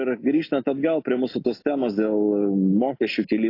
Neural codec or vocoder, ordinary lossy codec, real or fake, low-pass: none; AAC, 32 kbps; real; 5.4 kHz